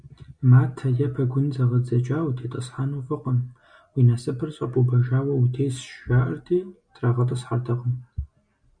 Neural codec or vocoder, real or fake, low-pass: none; real; 9.9 kHz